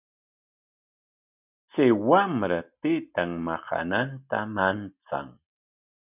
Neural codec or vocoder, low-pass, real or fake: none; 3.6 kHz; real